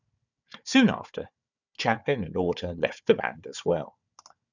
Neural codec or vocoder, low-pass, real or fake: codec, 16 kHz, 4 kbps, FunCodec, trained on Chinese and English, 50 frames a second; 7.2 kHz; fake